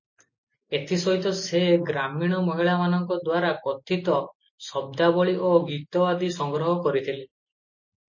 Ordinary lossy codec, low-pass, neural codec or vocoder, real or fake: MP3, 32 kbps; 7.2 kHz; none; real